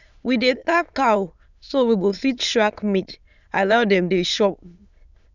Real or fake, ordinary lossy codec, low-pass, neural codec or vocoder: fake; none; 7.2 kHz; autoencoder, 22.05 kHz, a latent of 192 numbers a frame, VITS, trained on many speakers